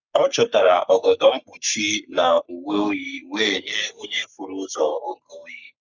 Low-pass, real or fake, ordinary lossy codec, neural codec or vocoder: 7.2 kHz; fake; none; codec, 44.1 kHz, 3.4 kbps, Pupu-Codec